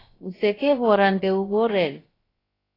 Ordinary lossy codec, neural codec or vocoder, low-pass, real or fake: AAC, 24 kbps; codec, 16 kHz, about 1 kbps, DyCAST, with the encoder's durations; 5.4 kHz; fake